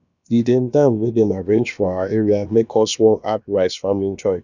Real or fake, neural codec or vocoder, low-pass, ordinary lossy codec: fake; codec, 16 kHz, about 1 kbps, DyCAST, with the encoder's durations; 7.2 kHz; none